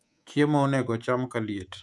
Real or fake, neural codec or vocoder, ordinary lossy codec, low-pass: fake; codec, 24 kHz, 3.1 kbps, DualCodec; none; none